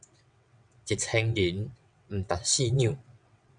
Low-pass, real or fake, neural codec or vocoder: 9.9 kHz; fake; vocoder, 22.05 kHz, 80 mel bands, WaveNeXt